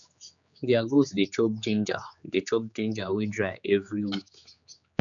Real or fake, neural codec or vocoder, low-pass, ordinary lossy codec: fake; codec, 16 kHz, 4 kbps, X-Codec, HuBERT features, trained on general audio; 7.2 kHz; none